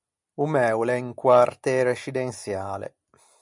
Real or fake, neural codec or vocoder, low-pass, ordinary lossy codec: real; none; 10.8 kHz; MP3, 96 kbps